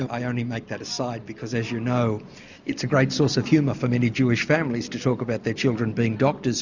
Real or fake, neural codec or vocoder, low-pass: real; none; 7.2 kHz